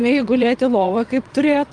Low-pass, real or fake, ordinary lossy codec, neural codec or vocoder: 9.9 kHz; fake; Opus, 32 kbps; vocoder, 22.05 kHz, 80 mel bands, WaveNeXt